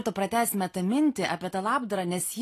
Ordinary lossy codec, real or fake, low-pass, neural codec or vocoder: AAC, 48 kbps; real; 14.4 kHz; none